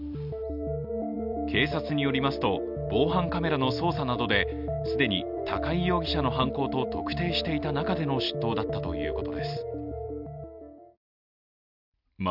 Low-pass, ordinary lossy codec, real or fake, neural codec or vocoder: 5.4 kHz; none; real; none